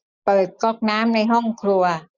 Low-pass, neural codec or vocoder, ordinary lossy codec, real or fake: 7.2 kHz; none; none; real